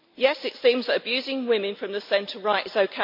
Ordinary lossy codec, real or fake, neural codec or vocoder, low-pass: MP3, 48 kbps; real; none; 5.4 kHz